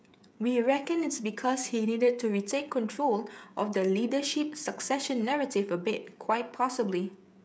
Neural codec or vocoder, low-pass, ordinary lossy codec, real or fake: codec, 16 kHz, 16 kbps, FreqCodec, smaller model; none; none; fake